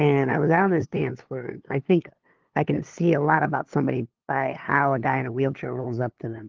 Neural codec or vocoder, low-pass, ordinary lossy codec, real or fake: codec, 16 kHz, 2 kbps, FunCodec, trained on LibriTTS, 25 frames a second; 7.2 kHz; Opus, 16 kbps; fake